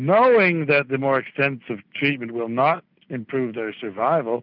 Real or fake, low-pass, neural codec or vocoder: real; 5.4 kHz; none